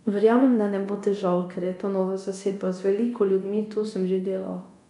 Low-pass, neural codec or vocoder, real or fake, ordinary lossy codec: 10.8 kHz; codec, 24 kHz, 0.9 kbps, DualCodec; fake; none